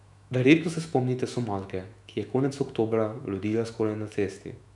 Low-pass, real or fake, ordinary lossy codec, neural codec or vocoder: 10.8 kHz; fake; none; autoencoder, 48 kHz, 128 numbers a frame, DAC-VAE, trained on Japanese speech